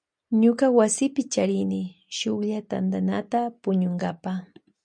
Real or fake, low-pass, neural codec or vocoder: real; 9.9 kHz; none